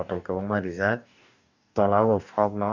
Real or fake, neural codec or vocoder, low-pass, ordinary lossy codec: fake; codec, 44.1 kHz, 2.6 kbps, DAC; 7.2 kHz; none